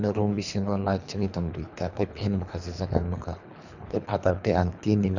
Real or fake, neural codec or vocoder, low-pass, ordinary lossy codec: fake; codec, 24 kHz, 3 kbps, HILCodec; 7.2 kHz; none